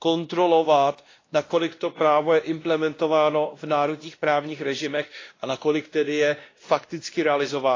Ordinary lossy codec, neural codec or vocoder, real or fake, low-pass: AAC, 32 kbps; codec, 16 kHz, 1 kbps, X-Codec, WavLM features, trained on Multilingual LibriSpeech; fake; 7.2 kHz